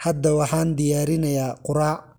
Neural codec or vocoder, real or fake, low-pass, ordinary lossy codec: none; real; none; none